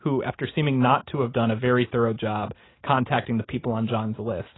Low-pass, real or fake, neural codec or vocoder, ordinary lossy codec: 7.2 kHz; real; none; AAC, 16 kbps